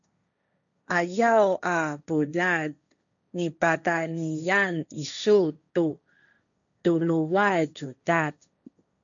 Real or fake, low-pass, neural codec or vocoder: fake; 7.2 kHz; codec, 16 kHz, 1.1 kbps, Voila-Tokenizer